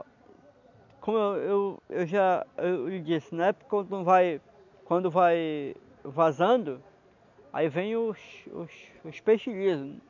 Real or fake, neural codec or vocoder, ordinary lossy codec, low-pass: real; none; none; 7.2 kHz